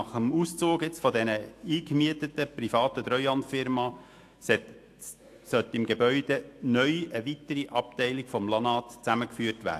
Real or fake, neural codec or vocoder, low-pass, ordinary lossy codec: fake; autoencoder, 48 kHz, 128 numbers a frame, DAC-VAE, trained on Japanese speech; 14.4 kHz; AAC, 64 kbps